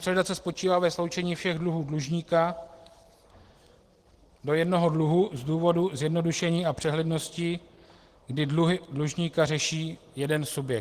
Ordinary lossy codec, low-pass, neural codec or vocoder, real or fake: Opus, 16 kbps; 14.4 kHz; none; real